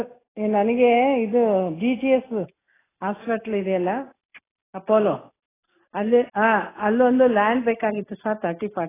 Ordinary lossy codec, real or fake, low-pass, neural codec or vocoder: AAC, 16 kbps; real; 3.6 kHz; none